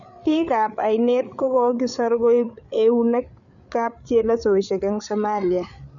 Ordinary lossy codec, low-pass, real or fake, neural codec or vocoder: none; 7.2 kHz; fake; codec, 16 kHz, 8 kbps, FreqCodec, larger model